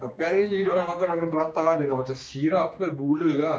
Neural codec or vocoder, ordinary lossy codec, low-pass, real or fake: codec, 16 kHz, 2 kbps, X-Codec, HuBERT features, trained on general audio; none; none; fake